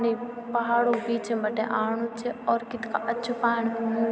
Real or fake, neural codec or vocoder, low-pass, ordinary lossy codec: real; none; none; none